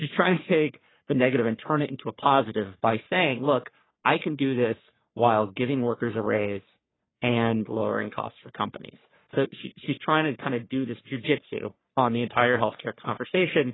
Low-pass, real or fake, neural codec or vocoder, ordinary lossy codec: 7.2 kHz; fake; codec, 44.1 kHz, 3.4 kbps, Pupu-Codec; AAC, 16 kbps